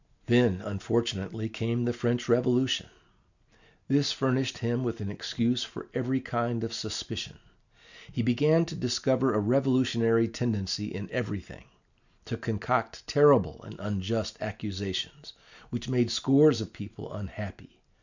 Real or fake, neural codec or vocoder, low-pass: real; none; 7.2 kHz